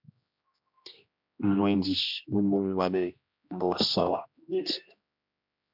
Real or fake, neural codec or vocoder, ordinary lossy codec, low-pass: fake; codec, 16 kHz, 1 kbps, X-Codec, HuBERT features, trained on general audio; MP3, 48 kbps; 5.4 kHz